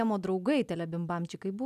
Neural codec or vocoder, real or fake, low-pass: none; real; 14.4 kHz